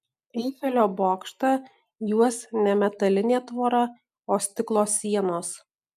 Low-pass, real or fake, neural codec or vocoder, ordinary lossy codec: 14.4 kHz; real; none; MP3, 96 kbps